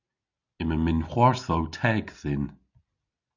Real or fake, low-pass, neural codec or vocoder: real; 7.2 kHz; none